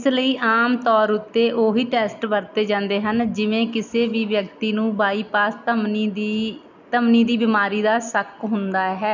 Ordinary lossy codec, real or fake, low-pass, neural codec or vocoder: none; real; 7.2 kHz; none